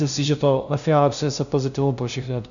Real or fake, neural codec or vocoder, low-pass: fake; codec, 16 kHz, 0.5 kbps, FunCodec, trained on LibriTTS, 25 frames a second; 7.2 kHz